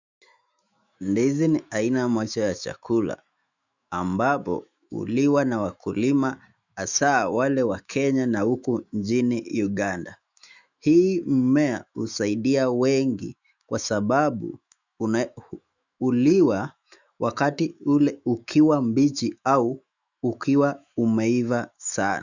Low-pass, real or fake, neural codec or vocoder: 7.2 kHz; fake; autoencoder, 48 kHz, 128 numbers a frame, DAC-VAE, trained on Japanese speech